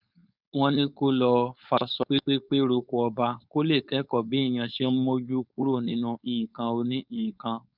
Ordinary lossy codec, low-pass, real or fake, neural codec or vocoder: none; 5.4 kHz; fake; codec, 16 kHz, 4.8 kbps, FACodec